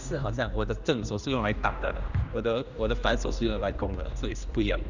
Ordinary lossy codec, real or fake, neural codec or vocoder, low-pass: none; fake; codec, 16 kHz, 1 kbps, X-Codec, HuBERT features, trained on general audio; 7.2 kHz